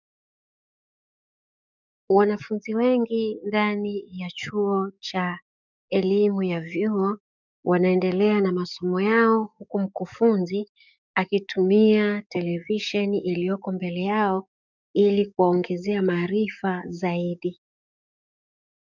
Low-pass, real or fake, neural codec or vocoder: 7.2 kHz; fake; codec, 44.1 kHz, 7.8 kbps, DAC